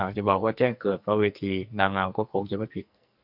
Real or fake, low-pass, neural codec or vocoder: fake; 5.4 kHz; codec, 44.1 kHz, 2.6 kbps, SNAC